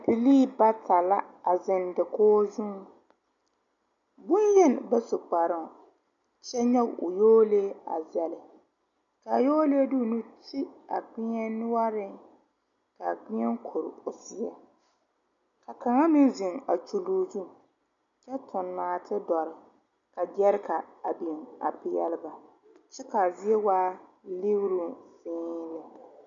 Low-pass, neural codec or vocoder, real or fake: 7.2 kHz; none; real